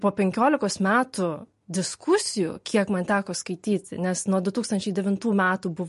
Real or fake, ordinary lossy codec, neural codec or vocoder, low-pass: real; MP3, 48 kbps; none; 14.4 kHz